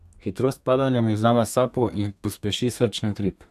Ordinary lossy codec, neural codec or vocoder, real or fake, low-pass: none; codec, 32 kHz, 1.9 kbps, SNAC; fake; 14.4 kHz